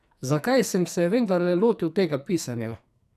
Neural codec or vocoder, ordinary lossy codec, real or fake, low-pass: codec, 44.1 kHz, 2.6 kbps, SNAC; none; fake; 14.4 kHz